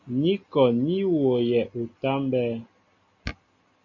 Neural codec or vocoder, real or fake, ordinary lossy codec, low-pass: none; real; MP3, 64 kbps; 7.2 kHz